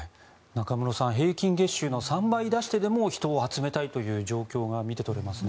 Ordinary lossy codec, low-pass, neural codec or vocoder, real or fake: none; none; none; real